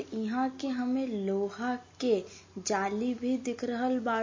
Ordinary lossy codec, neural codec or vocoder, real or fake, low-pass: MP3, 32 kbps; none; real; 7.2 kHz